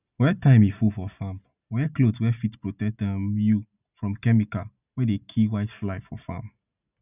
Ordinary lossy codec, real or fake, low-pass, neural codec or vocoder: none; real; 3.6 kHz; none